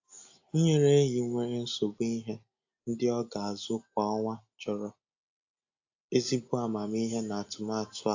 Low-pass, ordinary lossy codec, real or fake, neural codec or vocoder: 7.2 kHz; AAC, 48 kbps; real; none